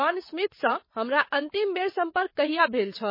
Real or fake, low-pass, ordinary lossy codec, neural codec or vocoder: fake; 5.4 kHz; MP3, 24 kbps; vocoder, 22.05 kHz, 80 mel bands, WaveNeXt